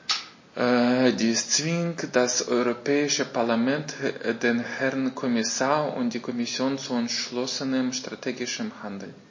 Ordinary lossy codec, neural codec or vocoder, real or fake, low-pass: MP3, 32 kbps; none; real; 7.2 kHz